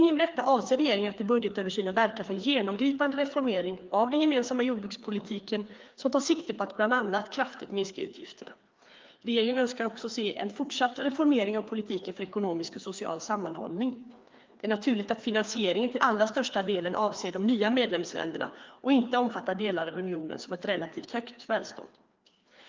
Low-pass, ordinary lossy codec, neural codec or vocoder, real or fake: 7.2 kHz; Opus, 32 kbps; codec, 16 kHz, 2 kbps, FreqCodec, larger model; fake